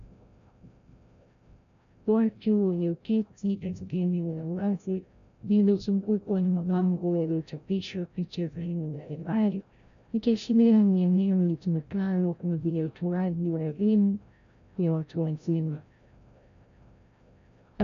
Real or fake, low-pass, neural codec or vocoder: fake; 7.2 kHz; codec, 16 kHz, 0.5 kbps, FreqCodec, larger model